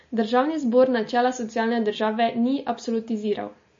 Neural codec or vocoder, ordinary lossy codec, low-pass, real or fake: none; MP3, 32 kbps; 7.2 kHz; real